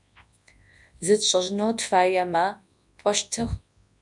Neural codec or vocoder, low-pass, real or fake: codec, 24 kHz, 0.9 kbps, WavTokenizer, large speech release; 10.8 kHz; fake